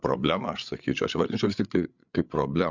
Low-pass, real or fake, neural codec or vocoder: 7.2 kHz; fake; codec, 16 kHz, 8 kbps, FreqCodec, larger model